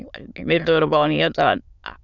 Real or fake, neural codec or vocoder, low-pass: fake; autoencoder, 22.05 kHz, a latent of 192 numbers a frame, VITS, trained on many speakers; 7.2 kHz